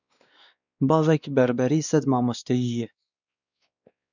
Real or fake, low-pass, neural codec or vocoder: fake; 7.2 kHz; codec, 16 kHz, 2 kbps, X-Codec, WavLM features, trained on Multilingual LibriSpeech